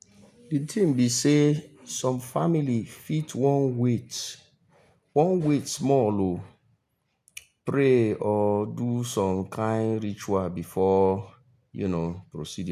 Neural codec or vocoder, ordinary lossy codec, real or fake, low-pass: none; none; real; 14.4 kHz